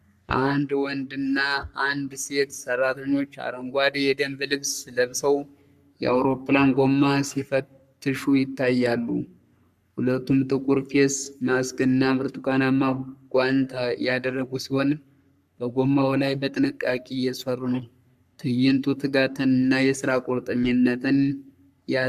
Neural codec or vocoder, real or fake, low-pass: codec, 44.1 kHz, 3.4 kbps, Pupu-Codec; fake; 14.4 kHz